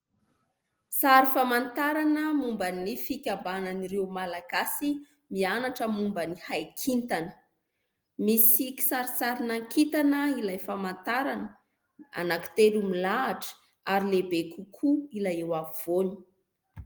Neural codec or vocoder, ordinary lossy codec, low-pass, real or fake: none; Opus, 24 kbps; 19.8 kHz; real